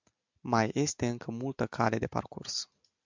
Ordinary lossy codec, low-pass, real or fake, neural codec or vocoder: MP3, 64 kbps; 7.2 kHz; real; none